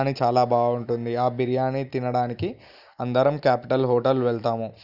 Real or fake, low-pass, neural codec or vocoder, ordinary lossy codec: real; 5.4 kHz; none; none